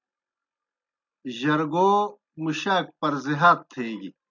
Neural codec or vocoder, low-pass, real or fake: none; 7.2 kHz; real